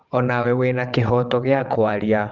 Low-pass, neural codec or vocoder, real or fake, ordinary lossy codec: 7.2 kHz; vocoder, 22.05 kHz, 80 mel bands, WaveNeXt; fake; Opus, 24 kbps